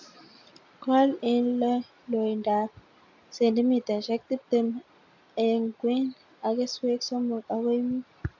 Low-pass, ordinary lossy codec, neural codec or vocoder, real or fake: 7.2 kHz; AAC, 48 kbps; none; real